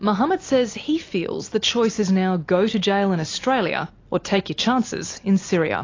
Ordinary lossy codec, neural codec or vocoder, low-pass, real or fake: AAC, 32 kbps; none; 7.2 kHz; real